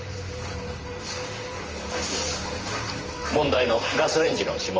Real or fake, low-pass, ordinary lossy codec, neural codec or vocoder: fake; 7.2 kHz; Opus, 24 kbps; vocoder, 44.1 kHz, 128 mel bands, Pupu-Vocoder